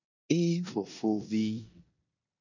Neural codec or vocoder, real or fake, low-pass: codec, 16 kHz in and 24 kHz out, 0.9 kbps, LongCat-Audio-Codec, four codebook decoder; fake; 7.2 kHz